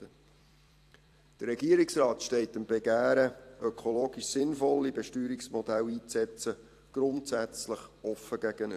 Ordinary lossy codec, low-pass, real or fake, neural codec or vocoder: AAC, 64 kbps; 14.4 kHz; fake; vocoder, 44.1 kHz, 128 mel bands every 256 samples, BigVGAN v2